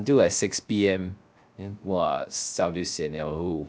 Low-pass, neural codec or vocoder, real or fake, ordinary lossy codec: none; codec, 16 kHz, 0.3 kbps, FocalCodec; fake; none